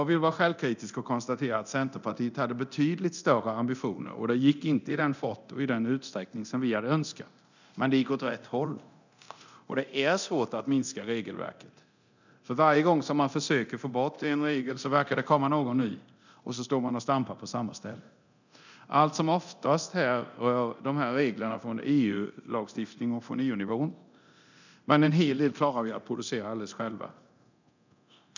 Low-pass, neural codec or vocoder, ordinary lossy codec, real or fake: 7.2 kHz; codec, 24 kHz, 0.9 kbps, DualCodec; none; fake